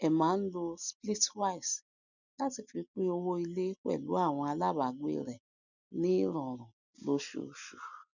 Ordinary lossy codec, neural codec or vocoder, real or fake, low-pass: none; none; real; 7.2 kHz